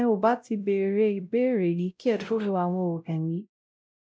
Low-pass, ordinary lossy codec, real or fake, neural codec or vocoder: none; none; fake; codec, 16 kHz, 0.5 kbps, X-Codec, WavLM features, trained on Multilingual LibriSpeech